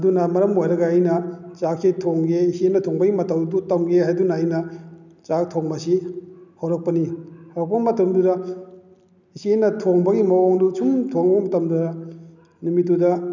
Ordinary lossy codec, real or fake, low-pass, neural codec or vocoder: none; real; 7.2 kHz; none